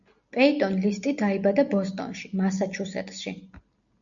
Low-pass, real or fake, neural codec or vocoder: 7.2 kHz; real; none